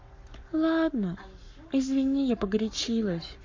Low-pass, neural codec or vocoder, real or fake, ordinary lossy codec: 7.2 kHz; codec, 44.1 kHz, 7.8 kbps, Pupu-Codec; fake; MP3, 48 kbps